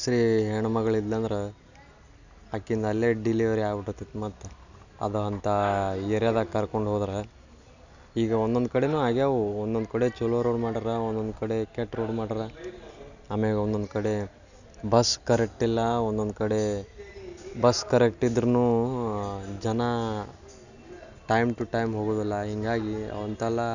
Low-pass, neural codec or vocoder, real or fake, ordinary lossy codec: 7.2 kHz; none; real; none